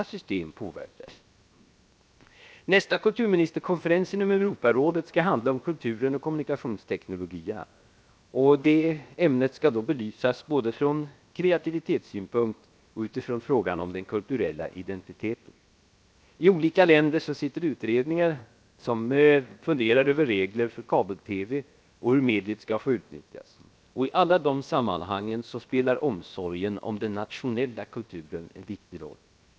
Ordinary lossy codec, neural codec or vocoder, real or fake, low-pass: none; codec, 16 kHz, 0.7 kbps, FocalCodec; fake; none